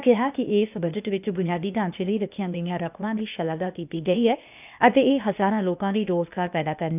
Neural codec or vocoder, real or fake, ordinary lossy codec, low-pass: codec, 16 kHz, 0.8 kbps, ZipCodec; fake; none; 3.6 kHz